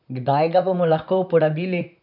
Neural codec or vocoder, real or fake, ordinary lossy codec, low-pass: vocoder, 44.1 kHz, 128 mel bands, Pupu-Vocoder; fake; none; 5.4 kHz